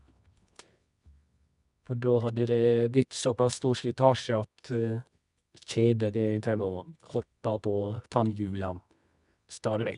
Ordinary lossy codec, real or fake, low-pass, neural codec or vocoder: none; fake; 10.8 kHz; codec, 24 kHz, 0.9 kbps, WavTokenizer, medium music audio release